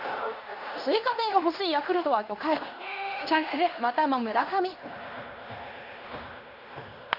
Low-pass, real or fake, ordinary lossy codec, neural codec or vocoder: 5.4 kHz; fake; none; codec, 16 kHz in and 24 kHz out, 0.9 kbps, LongCat-Audio-Codec, fine tuned four codebook decoder